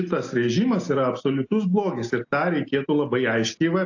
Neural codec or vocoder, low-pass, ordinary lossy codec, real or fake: none; 7.2 kHz; AAC, 48 kbps; real